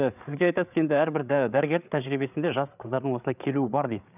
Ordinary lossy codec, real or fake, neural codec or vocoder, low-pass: none; fake; codec, 16 kHz, 8 kbps, FreqCodec, larger model; 3.6 kHz